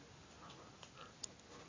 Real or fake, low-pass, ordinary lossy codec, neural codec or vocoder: real; 7.2 kHz; AAC, 32 kbps; none